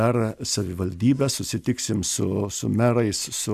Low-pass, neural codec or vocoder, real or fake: 14.4 kHz; none; real